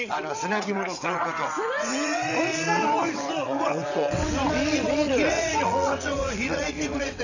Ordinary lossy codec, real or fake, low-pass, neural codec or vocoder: none; fake; 7.2 kHz; vocoder, 22.05 kHz, 80 mel bands, WaveNeXt